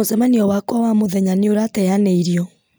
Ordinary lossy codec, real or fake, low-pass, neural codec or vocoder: none; real; none; none